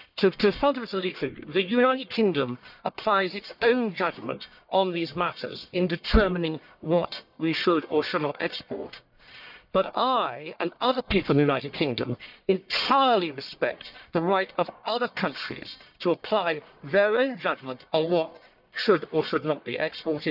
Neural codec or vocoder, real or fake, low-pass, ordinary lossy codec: codec, 44.1 kHz, 1.7 kbps, Pupu-Codec; fake; 5.4 kHz; none